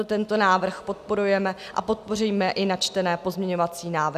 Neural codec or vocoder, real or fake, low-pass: none; real; 14.4 kHz